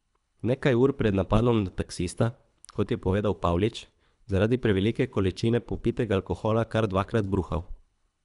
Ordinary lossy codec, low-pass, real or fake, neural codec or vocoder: none; 10.8 kHz; fake; codec, 24 kHz, 3 kbps, HILCodec